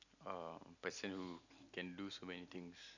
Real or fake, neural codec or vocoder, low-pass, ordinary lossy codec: real; none; 7.2 kHz; none